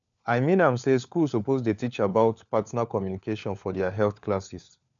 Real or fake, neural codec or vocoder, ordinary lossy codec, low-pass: fake; codec, 16 kHz, 4 kbps, FunCodec, trained on LibriTTS, 50 frames a second; none; 7.2 kHz